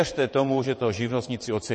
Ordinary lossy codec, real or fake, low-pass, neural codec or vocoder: MP3, 32 kbps; fake; 9.9 kHz; vocoder, 22.05 kHz, 80 mel bands, WaveNeXt